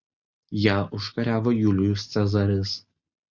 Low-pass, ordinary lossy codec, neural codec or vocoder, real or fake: 7.2 kHz; Opus, 64 kbps; none; real